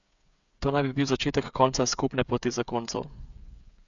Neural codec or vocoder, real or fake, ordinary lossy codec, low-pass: codec, 16 kHz, 8 kbps, FreqCodec, smaller model; fake; none; 7.2 kHz